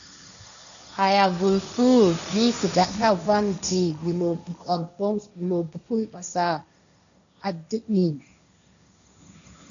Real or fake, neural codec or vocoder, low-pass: fake; codec, 16 kHz, 1.1 kbps, Voila-Tokenizer; 7.2 kHz